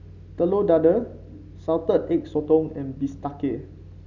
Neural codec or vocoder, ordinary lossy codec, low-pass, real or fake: none; none; 7.2 kHz; real